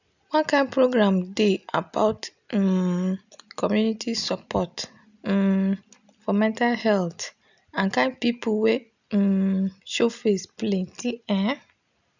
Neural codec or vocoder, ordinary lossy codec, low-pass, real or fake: none; none; 7.2 kHz; real